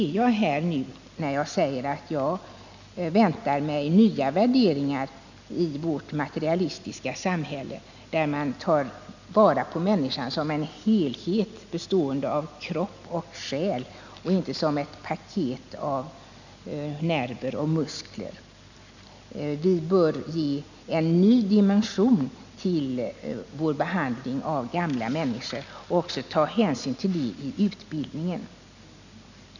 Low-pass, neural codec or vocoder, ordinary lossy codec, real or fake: 7.2 kHz; none; none; real